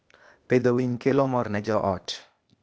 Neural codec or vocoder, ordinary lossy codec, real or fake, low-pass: codec, 16 kHz, 0.8 kbps, ZipCodec; none; fake; none